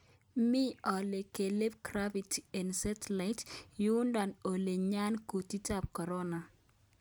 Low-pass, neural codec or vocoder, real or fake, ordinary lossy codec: none; none; real; none